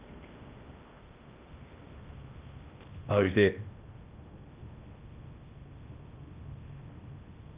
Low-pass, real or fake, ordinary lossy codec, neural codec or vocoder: 3.6 kHz; fake; Opus, 24 kbps; codec, 16 kHz in and 24 kHz out, 0.6 kbps, FocalCodec, streaming, 4096 codes